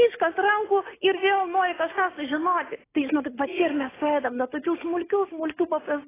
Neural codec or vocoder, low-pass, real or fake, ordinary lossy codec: none; 3.6 kHz; real; AAC, 16 kbps